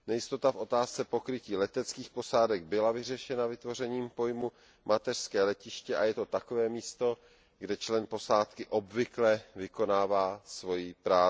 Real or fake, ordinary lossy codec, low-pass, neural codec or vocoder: real; none; none; none